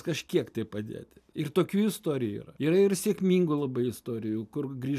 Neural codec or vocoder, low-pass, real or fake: none; 14.4 kHz; real